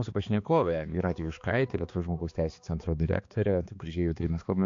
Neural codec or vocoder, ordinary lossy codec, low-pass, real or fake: codec, 16 kHz, 2 kbps, X-Codec, HuBERT features, trained on balanced general audio; AAC, 48 kbps; 7.2 kHz; fake